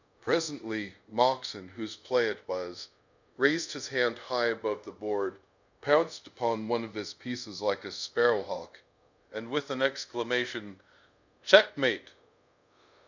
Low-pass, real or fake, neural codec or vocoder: 7.2 kHz; fake; codec, 24 kHz, 0.5 kbps, DualCodec